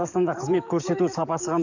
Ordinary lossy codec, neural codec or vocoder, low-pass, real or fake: none; codec, 44.1 kHz, 7.8 kbps, DAC; 7.2 kHz; fake